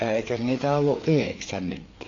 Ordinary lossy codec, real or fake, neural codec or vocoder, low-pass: AAC, 32 kbps; fake; codec, 16 kHz, 4 kbps, FreqCodec, larger model; 7.2 kHz